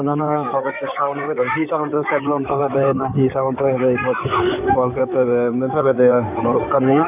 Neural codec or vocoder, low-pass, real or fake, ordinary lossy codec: codec, 16 kHz in and 24 kHz out, 2.2 kbps, FireRedTTS-2 codec; 3.6 kHz; fake; none